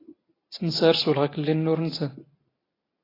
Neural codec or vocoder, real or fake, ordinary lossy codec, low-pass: none; real; AAC, 24 kbps; 5.4 kHz